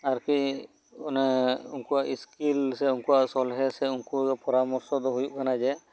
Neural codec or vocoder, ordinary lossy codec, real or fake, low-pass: none; none; real; none